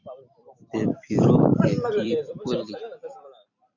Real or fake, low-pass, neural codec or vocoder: fake; 7.2 kHz; vocoder, 44.1 kHz, 128 mel bands every 256 samples, BigVGAN v2